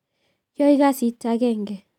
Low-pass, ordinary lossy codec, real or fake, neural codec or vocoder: 19.8 kHz; none; real; none